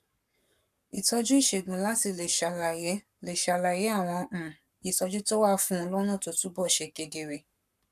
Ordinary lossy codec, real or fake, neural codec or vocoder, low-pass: none; fake; codec, 44.1 kHz, 7.8 kbps, Pupu-Codec; 14.4 kHz